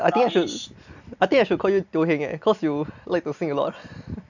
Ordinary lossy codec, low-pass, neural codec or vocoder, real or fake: none; 7.2 kHz; none; real